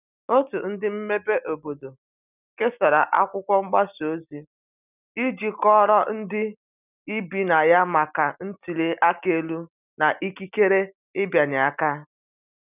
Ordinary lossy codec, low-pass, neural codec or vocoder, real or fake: none; 3.6 kHz; none; real